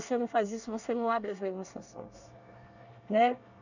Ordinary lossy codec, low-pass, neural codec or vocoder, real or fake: none; 7.2 kHz; codec, 24 kHz, 1 kbps, SNAC; fake